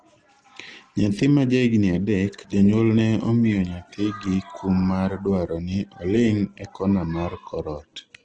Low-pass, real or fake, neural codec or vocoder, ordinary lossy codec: 9.9 kHz; real; none; Opus, 16 kbps